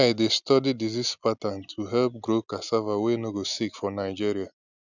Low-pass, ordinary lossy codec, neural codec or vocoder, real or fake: 7.2 kHz; none; none; real